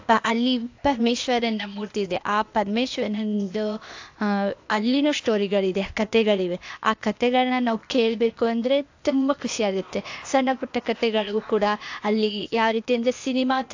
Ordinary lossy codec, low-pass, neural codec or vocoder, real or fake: AAC, 48 kbps; 7.2 kHz; codec, 16 kHz, 0.8 kbps, ZipCodec; fake